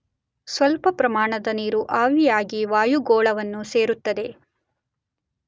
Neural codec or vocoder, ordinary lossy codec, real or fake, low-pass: none; none; real; none